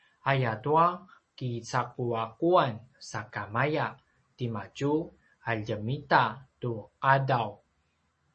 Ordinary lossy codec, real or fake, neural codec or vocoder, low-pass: MP3, 32 kbps; real; none; 10.8 kHz